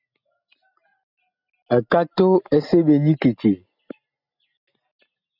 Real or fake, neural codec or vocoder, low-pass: real; none; 5.4 kHz